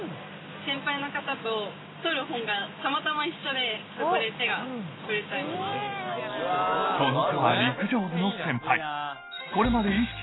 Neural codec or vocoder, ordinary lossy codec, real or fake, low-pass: none; AAC, 16 kbps; real; 7.2 kHz